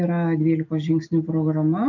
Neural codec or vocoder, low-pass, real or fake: none; 7.2 kHz; real